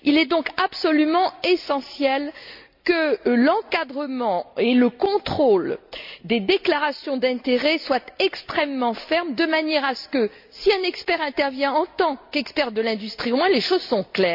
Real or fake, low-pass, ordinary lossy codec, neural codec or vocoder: real; 5.4 kHz; MP3, 48 kbps; none